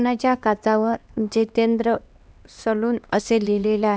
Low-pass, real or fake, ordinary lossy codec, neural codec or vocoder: none; fake; none; codec, 16 kHz, 2 kbps, X-Codec, HuBERT features, trained on LibriSpeech